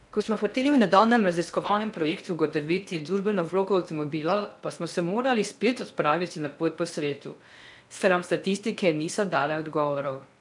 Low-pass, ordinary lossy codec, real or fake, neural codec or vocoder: 10.8 kHz; none; fake; codec, 16 kHz in and 24 kHz out, 0.6 kbps, FocalCodec, streaming, 2048 codes